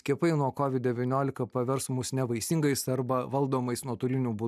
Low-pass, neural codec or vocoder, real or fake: 14.4 kHz; none; real